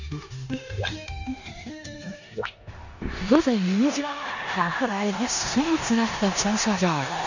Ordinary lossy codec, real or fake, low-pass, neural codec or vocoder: none; fake; 7.2 kHz; codec, 16 kHz in and 24 kHz out, 0.9 kbps, LongCat-Audio-Codec, four codebook decoder